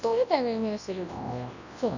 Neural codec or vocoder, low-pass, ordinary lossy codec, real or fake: codec, 24 kHz, 0.9 kbps, WavTokenizer, large speech release; 7.2 kHz; none; fake